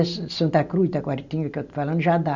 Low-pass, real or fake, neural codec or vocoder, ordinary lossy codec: 7.2 kHz; real; none; none